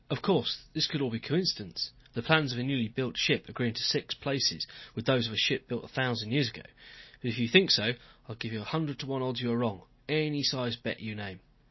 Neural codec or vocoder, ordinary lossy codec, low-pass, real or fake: none; MP3, 24 kbps; 7.2 kHz; real